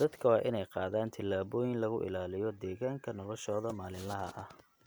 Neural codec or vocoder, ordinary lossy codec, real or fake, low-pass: none; none; real; none